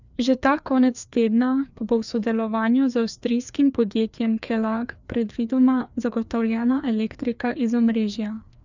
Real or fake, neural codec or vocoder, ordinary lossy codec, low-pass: fake; codec, 16 kHz, 2 kbps, FreqCodec, larger model; none; 7.2 kHz